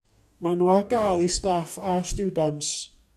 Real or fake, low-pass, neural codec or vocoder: fake; 14.4 kHz; codec, 44.1 kHz, 2.6 kbps, DAC